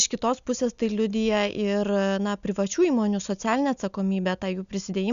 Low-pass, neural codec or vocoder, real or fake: 7.2 kHz; none; real